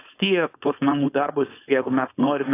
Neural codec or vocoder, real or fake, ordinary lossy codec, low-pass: codec, 16 kHz, 4.8 kbps, FACodec; fake; AAC, 16 kbps; 3.6 kHz